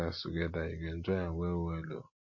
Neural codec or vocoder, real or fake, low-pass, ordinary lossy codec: none; real; 7.2 kHz; MP3, 32 kbps